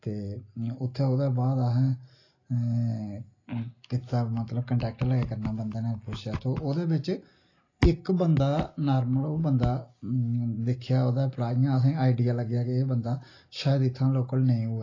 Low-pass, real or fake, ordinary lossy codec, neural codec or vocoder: 7.2 kHz; real; AAC, 32 kbps; none